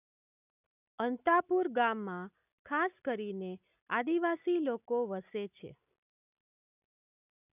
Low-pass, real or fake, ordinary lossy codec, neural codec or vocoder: 3.6 kHz; real; none; none